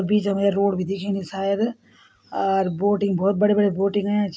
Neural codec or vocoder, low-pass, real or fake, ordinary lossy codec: none; none; real; none